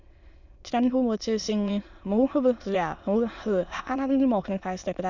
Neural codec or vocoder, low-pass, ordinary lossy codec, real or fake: autoencoder, 22.05 kHz, a latent of 192 numbers a frame, VITS, trained on many speakers; 7.2 kHz; none; fake